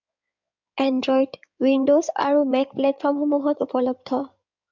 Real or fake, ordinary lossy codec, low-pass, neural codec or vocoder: fake; MP3, 64 kbps; 7.2 kHz; codec, 16 kHz in and 24 kHz out, 2.2 kbps, FireRedTTS-2 codec